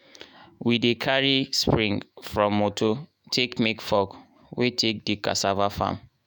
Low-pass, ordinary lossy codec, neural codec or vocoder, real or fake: none; none; autoencoder, 48 kHz, 128 numbers a frame, DAC-VAE, trained on Japanese speech; fake